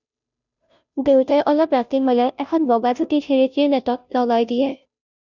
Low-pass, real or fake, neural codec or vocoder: 7.2 kHz; fake; codec, 16 kHz, 0.5 kbps, FunCodec, trained on Chinese and English, 25 frames a second